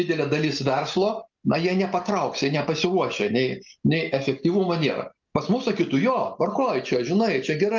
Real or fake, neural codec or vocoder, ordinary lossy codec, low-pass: real; none; Opus, 32 kbps; 7.2 kHz